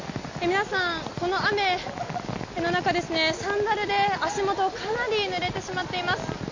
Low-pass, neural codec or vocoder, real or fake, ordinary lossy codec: 7.2 kHz; none; real; AAC, 48 kbps